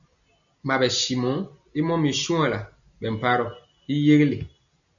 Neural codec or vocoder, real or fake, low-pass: none; real; 7.2 kHz